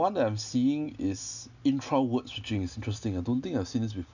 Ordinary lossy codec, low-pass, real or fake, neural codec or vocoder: none; 7.2 kHz; real; none